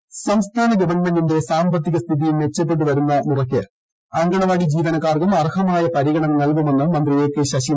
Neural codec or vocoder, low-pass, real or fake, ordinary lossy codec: none; none; real; none